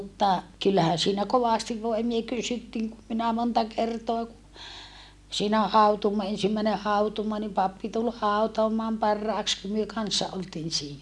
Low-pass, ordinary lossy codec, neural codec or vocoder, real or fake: none; none; none; real